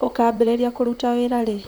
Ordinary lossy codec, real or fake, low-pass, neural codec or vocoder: none; fake; none; vocoder, 44.1 kHz, 128 mel bands, Pupu-Vocoder